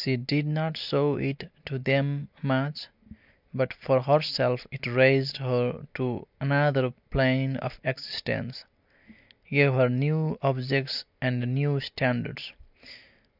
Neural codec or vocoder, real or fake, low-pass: none; real; 5.4 kHz